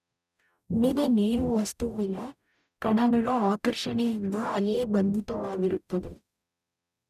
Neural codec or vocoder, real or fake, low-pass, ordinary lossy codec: codec, 44.1 kHz, 0.9 kbps, DAC; fake; 14.4 kHz; none